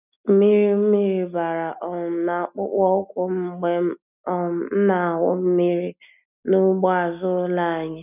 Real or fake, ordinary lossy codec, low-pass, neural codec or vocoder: real; none; 3.6 kHz; none